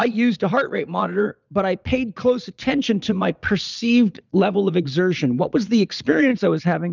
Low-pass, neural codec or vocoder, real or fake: 7.2 kHz; vocoder, 22.05 kHz, 80 mel bands, Vocos; fake